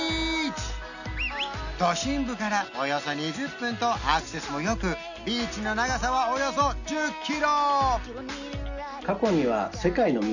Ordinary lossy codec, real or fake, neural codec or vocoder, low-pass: none; real; none; 7.2 kHz